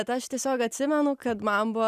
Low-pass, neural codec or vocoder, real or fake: 14.4 kHz; none; real